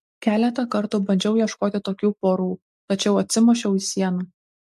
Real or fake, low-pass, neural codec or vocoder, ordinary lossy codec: real; 14.4 kHz; none; MP3, 64 kbps